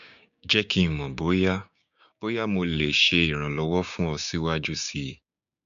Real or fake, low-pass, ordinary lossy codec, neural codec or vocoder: fake; 7.2 kHz; none; codec, 16 kHz, 6 kbps, DAC